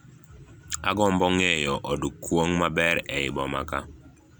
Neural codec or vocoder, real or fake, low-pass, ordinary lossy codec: none; real; none; none